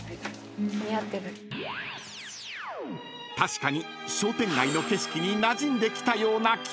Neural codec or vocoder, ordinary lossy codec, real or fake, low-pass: none; none; real; none